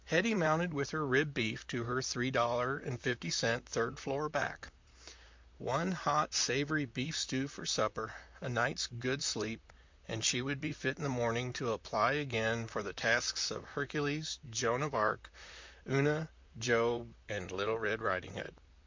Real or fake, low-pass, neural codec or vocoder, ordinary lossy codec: fake; 7.2 kHz; vocoder, 44.1 kHz, 128 mel bands, Pupu-Vocoder; MP3, 64 kbps